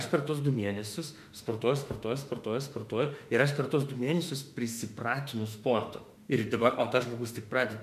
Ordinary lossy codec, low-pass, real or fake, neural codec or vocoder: MP3, 96 kbps; 14.4 kHz; fake; autoencoder, 48 kHz, 32 numbers a frame, DAC-VAE, trained on Japanese speech